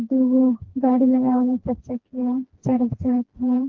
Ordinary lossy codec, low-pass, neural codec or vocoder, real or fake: Opus, 16 kbps; 7.2 kHz; codec, 16 kHz, 2 kbps, FreqCodec, smaller model; fake